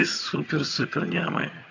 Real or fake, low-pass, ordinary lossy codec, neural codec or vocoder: fake; 7.2 kHz; AAC, 48 kbps; vocoder, 22.05 kHz, 80 mel bands, HiFi-GAN